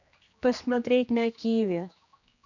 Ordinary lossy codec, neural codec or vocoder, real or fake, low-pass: none; codec, 16 kHz, 2 kbps, X-Codec, HuBERT features, trained on general audio; fake; 7.2 kHz